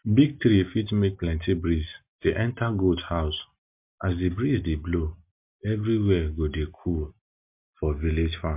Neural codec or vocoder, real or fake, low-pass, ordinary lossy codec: none; real; 3.6 kHz; MP3, 32 kbps